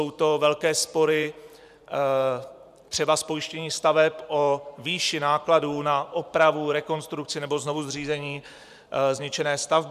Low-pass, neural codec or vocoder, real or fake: 14.4 kHz; vocoder, 44.1 kHz, 128 mel bands every 512 samples, BigVGAN v2; fake